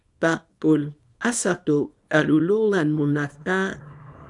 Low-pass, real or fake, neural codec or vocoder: 10.8 kHz; fake; codec, 24 kHz, 0.9 kbps, WavTokenizer, small release